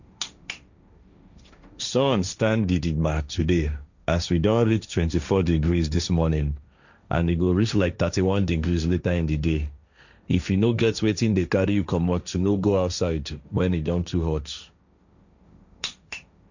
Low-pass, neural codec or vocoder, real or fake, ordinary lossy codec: 7.2 kHz; codec, 16 kHz, 1.1 kbps, Voila-Tokenizer; fake; none